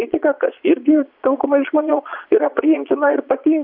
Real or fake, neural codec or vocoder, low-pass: fake; vocoder, 22.05 kHz, 80 mel bands, Vocos; 5.4 kHz